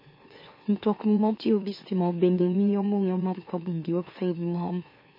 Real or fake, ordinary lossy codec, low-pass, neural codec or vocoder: fake; MP3, 24 kbps; 5.4 kHz; autoencoder, 44.1 kHz, a latent of 192 numbers a frame, MeloTTS